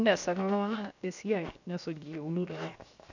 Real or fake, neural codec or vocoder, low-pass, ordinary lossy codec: fake; codec, 16 kHz, 0.7 kbps, FocalCodec; 7.2 kHz; none